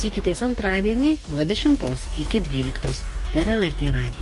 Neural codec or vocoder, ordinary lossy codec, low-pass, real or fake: codec, 44.1 kHz, 2.6 kbps, DAC; MP3, 48 kbps; 14.4 kHz; fake